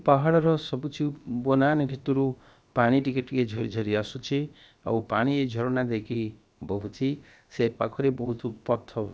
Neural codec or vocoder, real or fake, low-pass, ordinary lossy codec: codec, 16 kHz, about 1 kbps, DyCAST, with the encoder's durations; fake; none; none